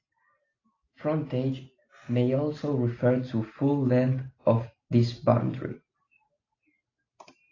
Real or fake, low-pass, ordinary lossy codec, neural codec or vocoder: real; 7.2 kHz; AAC, 32 kbps; none